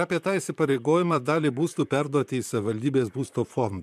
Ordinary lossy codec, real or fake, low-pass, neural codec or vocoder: MP3, 96 kbps; fake; 14.4 kHz; vocoder, 44.1 kHz, 128 mel bands, Pupu-Vocoder